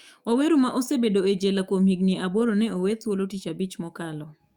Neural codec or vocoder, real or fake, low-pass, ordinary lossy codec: autoencoder, 48 kHz, 128 numbers a frame, DAC-VAE, trained on Japanese speech; fake; 19.8 kHz; Opus, 64 kbps